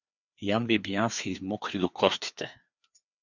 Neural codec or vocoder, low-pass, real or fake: codec, 16 kHz, 2 kbps, FreqCodec, larger model; 7.2 kHz; fake